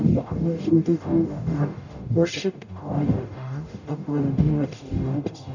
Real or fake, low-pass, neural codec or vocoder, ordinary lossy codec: fake; 7.2 kHz; codec, 44.1 kHz, 0.9 kbps, DAC; none